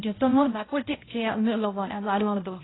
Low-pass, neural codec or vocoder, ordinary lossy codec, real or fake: 7.2 kHz; codec, 16 kHz, 0.5 kbps, X-Codec, HuBERT features, trained on balanced general audio; AAC, 16 kbps; fake